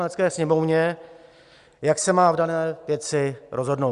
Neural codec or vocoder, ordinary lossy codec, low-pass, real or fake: none; Opus, 64 kbps; 10.8 kHz; real